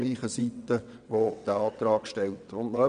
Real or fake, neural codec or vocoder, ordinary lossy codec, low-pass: fake; vocoder, 22.05 kHz, 80 mel bands, WaveNeXt; none; 9.9 kHz